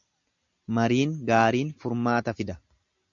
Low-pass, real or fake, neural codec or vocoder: 7.2 kHz; real; none